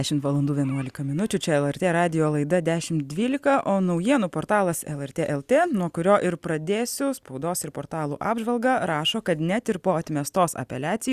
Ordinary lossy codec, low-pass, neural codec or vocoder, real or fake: Opus, 64 kbps; 14.4 kHz; none; real